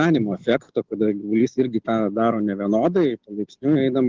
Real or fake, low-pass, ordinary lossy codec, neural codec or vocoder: real; 7.2 kHz; Opus, 16 kbps; none